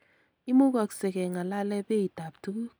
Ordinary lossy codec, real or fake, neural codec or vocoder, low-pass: none; real; none; none